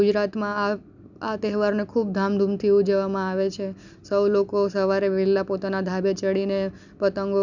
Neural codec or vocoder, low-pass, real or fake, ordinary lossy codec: none; 7.2 kHz; real; none